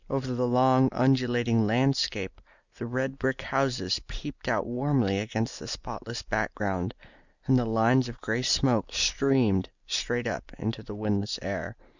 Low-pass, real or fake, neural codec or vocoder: 7.2 kHz; real; none